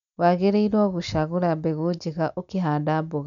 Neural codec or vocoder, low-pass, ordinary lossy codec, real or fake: none; 7.2 kHz; none; real